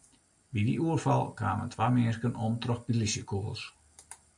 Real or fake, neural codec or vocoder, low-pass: real; none; 10.8 kHz